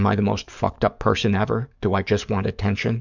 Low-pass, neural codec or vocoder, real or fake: 7.2 kHz; codec, 44.1 kHz, 7.8 kbps, DAC; fake